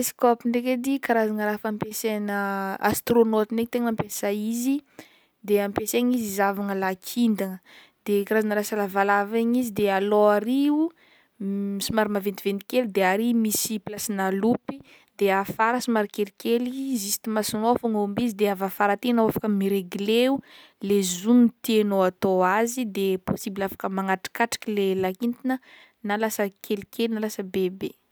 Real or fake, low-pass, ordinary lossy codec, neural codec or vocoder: real; none; none; none